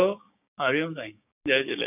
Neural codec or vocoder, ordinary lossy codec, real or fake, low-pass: codec, 16 kHz, 6 kbps, DAC; none; fake; 3.6 kHz